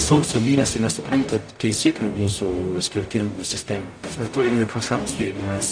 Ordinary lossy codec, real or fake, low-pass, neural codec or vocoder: AAC, 64 kbps; fake; 9.9 kHz; codec, 44.1 kHz, 0.9 kbps, DAC